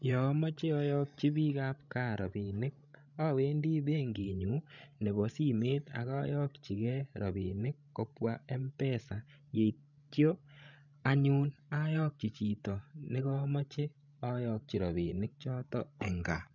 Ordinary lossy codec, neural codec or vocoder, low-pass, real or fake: none; codec, 16 kHz, 16 kbps, FreqCodec, larger model; 7.2 kHz; fake